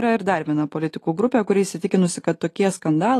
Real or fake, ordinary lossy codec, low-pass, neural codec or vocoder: real; AAC, 48 kbps; 14.4 kHz; none